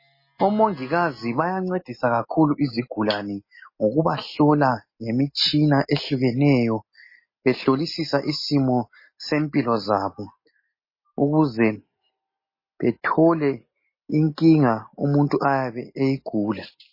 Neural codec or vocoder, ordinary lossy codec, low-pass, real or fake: none; MP3, 24 kbps; 5.4 kHz; real